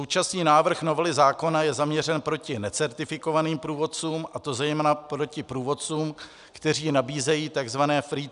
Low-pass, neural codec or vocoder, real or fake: 10.8 kHz; none; real